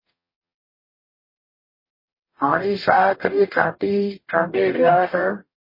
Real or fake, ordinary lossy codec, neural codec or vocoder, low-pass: fake; MP3, 24 kbps; codec, 44.1 kHz, 0.9 kbps, DAC; 5.4 kHz